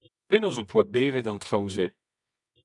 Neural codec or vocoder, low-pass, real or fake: codec, 24 kHz, 0.9 kbps, WavTokenizer, medium music audio release; 10.8 kHz; fake